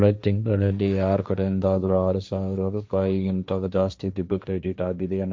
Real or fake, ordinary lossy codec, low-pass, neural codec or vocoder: fake; none; none; codec, 16 kHz, 1.1 kbps, Voila-Tokenizer